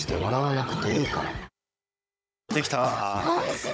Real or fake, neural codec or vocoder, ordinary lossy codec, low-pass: fake; codec, 16 kHz, 16 kbps, FunCodec, trained on Chinese and English, 50 frames a second; none; none